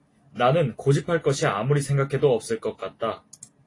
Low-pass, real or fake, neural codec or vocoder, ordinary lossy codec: 10.8 kHz; real; none; AAC, 32 kbps